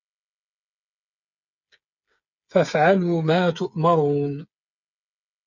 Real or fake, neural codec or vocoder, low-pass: fake; codec, 16 kHz, 8 kbps, FreqCodec, smaller model; 7.2 kHz